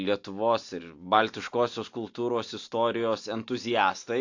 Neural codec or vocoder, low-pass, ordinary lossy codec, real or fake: none; 7.2 kHz; AAC, 48 kbps; real